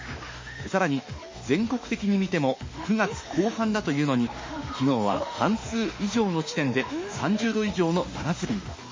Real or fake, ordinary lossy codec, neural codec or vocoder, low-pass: fake; MP3, 32 kbps; autoencoder, 48 kHz, 32 numbers a frame, DAC-VAE, trained on Japanese speech; 7.2 kHz